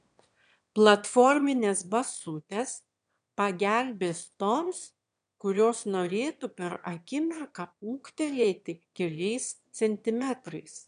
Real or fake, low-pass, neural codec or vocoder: fake; 9.9 kHz; autoencoder, 22.05 kHz, a latent of 192 numbers a frame, VITS, trained on one speaker